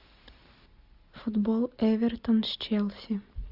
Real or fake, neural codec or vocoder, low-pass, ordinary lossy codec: real; none; 5.4 kHz; Opus, 64 kbps